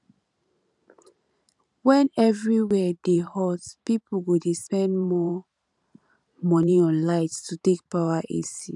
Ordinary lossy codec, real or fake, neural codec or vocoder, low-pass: none; fake; vocoder, 44.1 kHz, 128 mel bands every 512 samples, BigVGAN v2; 10.8 kHz